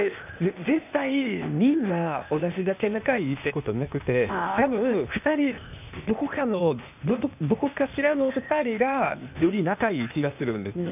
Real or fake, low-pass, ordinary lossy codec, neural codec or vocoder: fake; 3.6 kHz; none; codec, 16 kHz, 0.8 kbps, ZipCodec